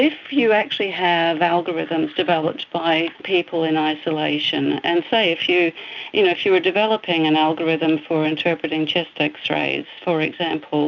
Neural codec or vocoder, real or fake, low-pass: none; real; 7.2 kHz